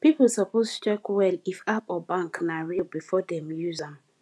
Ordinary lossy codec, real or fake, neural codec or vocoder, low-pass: none; fake; vocoder, 24 kHz, 100 mel bands, Vocos; none